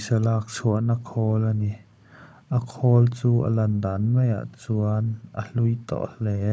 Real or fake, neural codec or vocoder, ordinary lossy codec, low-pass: fake; codec, 16 kHz, 16 kbps, FunCodec, trained on Chinese and English, 50 frames a second; none; none